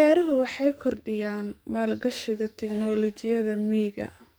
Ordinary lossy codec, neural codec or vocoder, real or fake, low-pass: none; codec, 44.1 kHz, 2.6 kbps, SNAC; fake; none